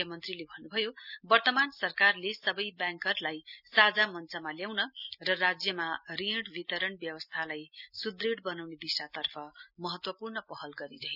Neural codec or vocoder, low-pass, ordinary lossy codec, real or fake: none; 5.4 kHz; none; real